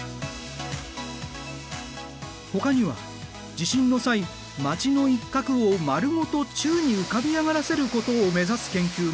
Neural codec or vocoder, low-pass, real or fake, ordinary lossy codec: none; none; real; none